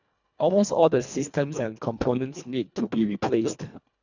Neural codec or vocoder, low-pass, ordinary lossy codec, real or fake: codec, 24 kHz, 1.5 kbps, HILCodec; 7.2 kHz; none; fake